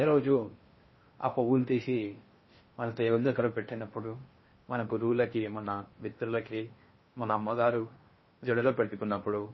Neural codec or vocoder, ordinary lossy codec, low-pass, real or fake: codec, 16 kHz in and 24 kHz out, 0.6 kbps, FocalCodec, streaming, 4096 codes; MP3, 24 kbps; 7.2 kHz; fake